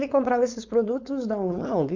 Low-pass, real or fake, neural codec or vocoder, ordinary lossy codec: 7.2 kHz; fake; codec, 16 kHz, 4.8 kbps, FACodec; MP3, 64 kbps